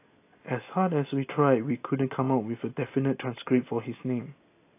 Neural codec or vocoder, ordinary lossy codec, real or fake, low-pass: none; AAC, 24 kbps; real; 3.6 kHz